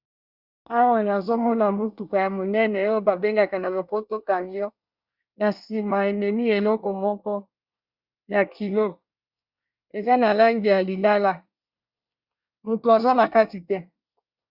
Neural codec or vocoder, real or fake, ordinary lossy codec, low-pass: codec, 24 kHz, 1 kbps, SNAC; fake; Opus, 64 kbps; 5.4 kHz